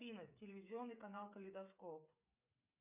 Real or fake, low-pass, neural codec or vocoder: fake; 3.6 kHz; codec, 16 kHz, 8 kbps, FreqCodec, smaller model